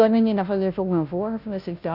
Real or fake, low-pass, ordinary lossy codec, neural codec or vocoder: fake; 5.4 kHz; none; codec, 16 kHz, 0.5 kbps, FunCodec, trained on Chinese and English, 25 frames a second